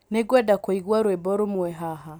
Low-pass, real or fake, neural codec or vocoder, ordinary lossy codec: none; real; none; none